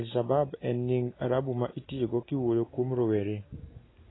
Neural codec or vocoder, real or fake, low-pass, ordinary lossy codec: none; real; 7.2 kHz; AAC, 16 kbps